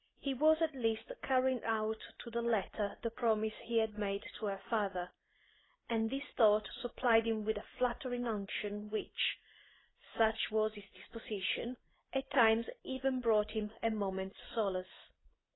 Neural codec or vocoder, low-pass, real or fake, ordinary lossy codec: none; 7.2 kHz; real; AAC, 16 kbps